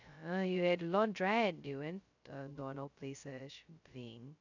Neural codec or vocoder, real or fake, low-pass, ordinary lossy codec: codec, 16 kHz, 0.2 kbps, FocalCodec; fake; 7.2 kHz; none